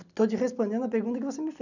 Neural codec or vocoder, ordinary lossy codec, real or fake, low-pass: none; none; real; 7.2 kHz